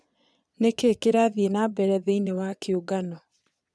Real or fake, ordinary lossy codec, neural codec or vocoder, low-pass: fake; none; vocoder, 22.05 kHz, 80 mel bands, Vocos; none